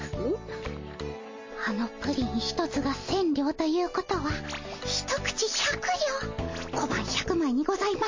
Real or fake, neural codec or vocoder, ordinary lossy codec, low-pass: real; none; MP3, 32 kbps; 7.2 kHz